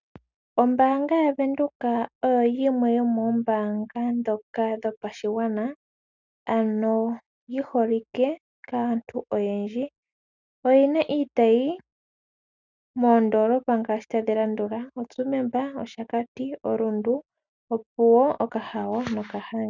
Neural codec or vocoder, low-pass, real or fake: none; 7.2 kHz; real